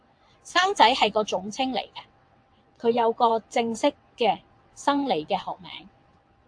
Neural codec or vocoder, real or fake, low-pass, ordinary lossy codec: vocoder, 22.05 kHz, 80 mel bands, WaveNeXt; fake; 9.9 kHz; AAC, 64 kbps